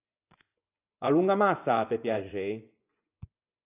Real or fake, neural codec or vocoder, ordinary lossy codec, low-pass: fake; vocoder, 24 kHz, 100 mel bands, Vocos; AAC, 24 kbps; 3.6 kHz